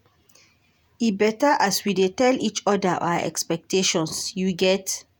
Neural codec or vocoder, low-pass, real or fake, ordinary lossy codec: vocoder, 48 kHz, 128 mel bands, Vocos; none; fake; none